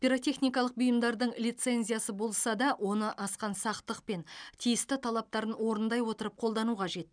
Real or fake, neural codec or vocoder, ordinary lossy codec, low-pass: real; none; none; 9.9 kHz